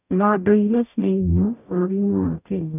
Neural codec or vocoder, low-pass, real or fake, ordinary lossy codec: codec, 44.1 kHz, 0.9 kbps, DAC; 3.6 kHz; fake; none